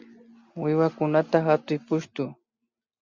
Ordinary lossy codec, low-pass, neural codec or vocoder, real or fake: Opus, 64 kbps; 7.2 kHz; none; real